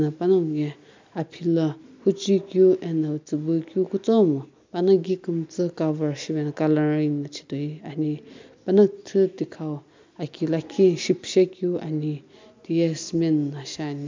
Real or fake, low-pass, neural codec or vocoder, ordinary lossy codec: real; 7.2 kHz; none; MP3, 64 kbps